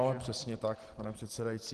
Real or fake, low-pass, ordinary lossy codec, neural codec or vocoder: real; 10.8 kHz; Opus, 16 kbps; none